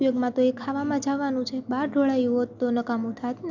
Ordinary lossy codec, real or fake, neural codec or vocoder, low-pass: none; real; none; 7.2 kHz